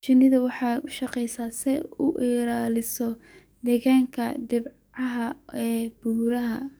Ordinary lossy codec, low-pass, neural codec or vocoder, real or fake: none; none; codec, 44.1 kHz, 7.8 kbps, DAC; fake